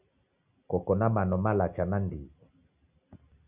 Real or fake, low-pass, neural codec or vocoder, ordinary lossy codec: real; 3.6 kHz; none; Opus, 64 kbps